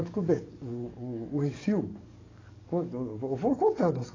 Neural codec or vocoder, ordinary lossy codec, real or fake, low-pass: codec, 44.1 kHz, 7.8 kbps, DAC; AAC, 32 kbps; fake; 7.2 kHz